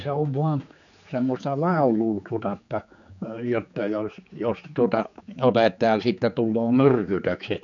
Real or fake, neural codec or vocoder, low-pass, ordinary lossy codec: fake; codec, 16 kHz, 4 kbps, X-Codec, HuBERT features, trained on general audio; 7.2 kHz; none